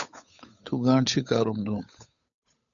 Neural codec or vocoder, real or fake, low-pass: codec, 16 kHz, 8 kbps, FunCodec, trained on Chinese and English, 25 frames a second; fake; 7.2 kHz